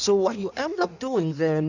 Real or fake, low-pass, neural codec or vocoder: fake; 7.2 kHz; codec, 16 kHz in and 24 kHz out, 1.1 kbps, FireRedTTS-2 codec